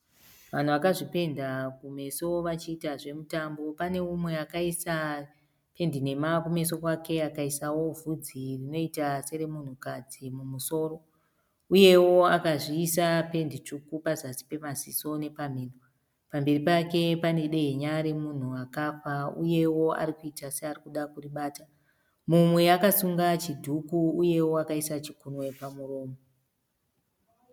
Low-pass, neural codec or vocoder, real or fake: 19.8 kHz; none; real